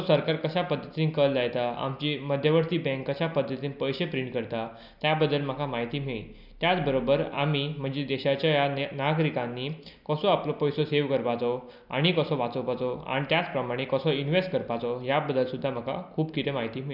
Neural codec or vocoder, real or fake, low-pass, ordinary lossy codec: none; real; 5.4 kHz; none